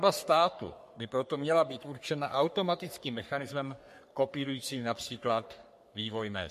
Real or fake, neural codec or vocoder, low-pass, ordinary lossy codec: fake; codec, 44.1 kHz, 3.4 kbps, Pupu-Codec; 14.4 kHz; MP3, 64 kbps